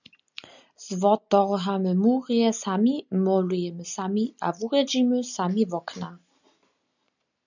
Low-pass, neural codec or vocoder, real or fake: 7.2 kHz; none; real